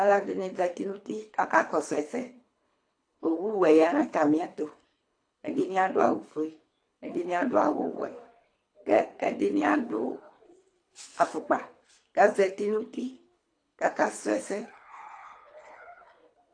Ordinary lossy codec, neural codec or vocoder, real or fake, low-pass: AAC, 48 kbps; codec, 24 kHz, 3 kbps, HILCodec; fake; 9.9 kHz